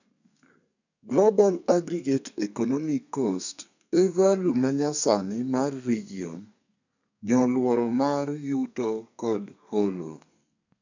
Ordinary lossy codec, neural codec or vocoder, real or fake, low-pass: none; codec, 32 kHz, 1.9 kbps, SNAC; fake; 7.2 kHz